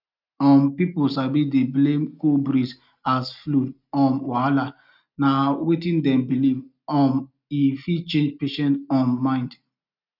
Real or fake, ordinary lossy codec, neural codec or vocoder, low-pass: real; none; none; 5.4 kHz